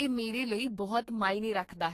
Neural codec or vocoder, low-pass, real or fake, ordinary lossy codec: codec, 32 kHz, 1.9 kbps, SNAC; 14.4 kHz; fake; AAC, 48 kbps